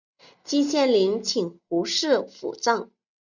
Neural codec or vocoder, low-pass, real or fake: none; 7.2 kHz; real